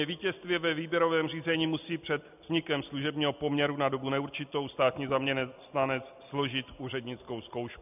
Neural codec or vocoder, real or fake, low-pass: none; real; 3.6 kHz